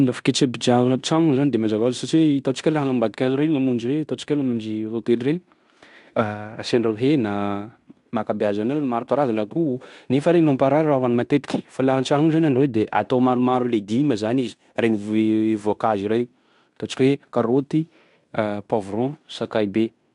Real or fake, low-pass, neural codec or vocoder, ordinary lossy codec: fake; 10.8 kHz; codec, 16 kHz in and 24 kHz out, 0.9 kbps, LongCat-Audio-Codec, fine tuned four codebook decoder; none